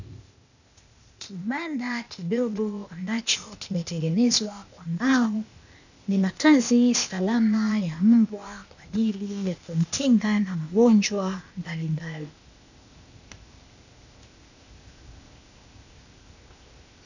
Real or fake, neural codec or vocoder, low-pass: fake; codec, 16 kHz, 0.8 kbps, ZipCodec; 7.2 kHz